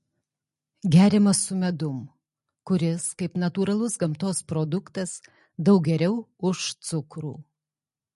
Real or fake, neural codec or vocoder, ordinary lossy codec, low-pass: real; none; MP3, 48 kbps; 14.4 kHz